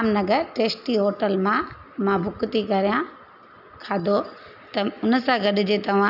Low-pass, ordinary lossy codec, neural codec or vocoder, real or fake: 5.4 kHz; none; none; real